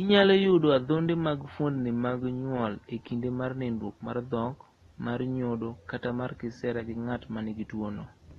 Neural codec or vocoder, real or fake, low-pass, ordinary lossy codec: none; real; 19.8 kHz; AAC, 32 kbps